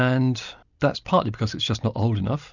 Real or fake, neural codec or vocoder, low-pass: real; none; 7.2 kHz